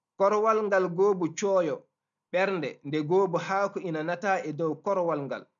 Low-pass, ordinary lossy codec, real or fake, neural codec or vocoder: 7.2 kHz; none; real; none